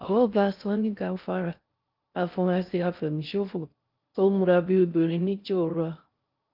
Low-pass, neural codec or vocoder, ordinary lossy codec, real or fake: 5.4 kHz; codec, 16 kHz in and 24 kHz out, 0.6 kbps, FocalCodec, streaming, 2048 codes; Opus, 24 kbps; fake